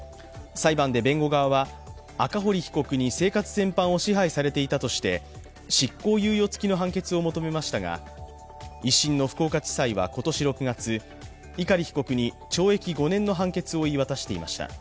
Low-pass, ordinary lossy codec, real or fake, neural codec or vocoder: none; none; real; none